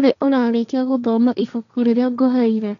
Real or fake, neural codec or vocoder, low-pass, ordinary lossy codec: fake; codec, 16 kHz, 1.1 kbps, Voila-Tokenizer; 7.2 kHz; none